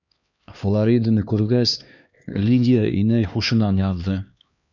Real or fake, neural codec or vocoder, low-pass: fake; codec, 16 kHz, 2 kbps, X-Codec, HuBERT features, trained on LibriSpeech; 7.2 kHz